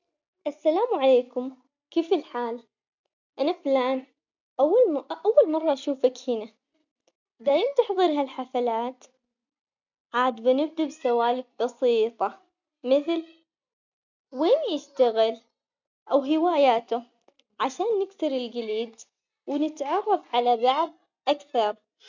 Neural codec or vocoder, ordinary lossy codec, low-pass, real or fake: none; none; 7.2 kHz; real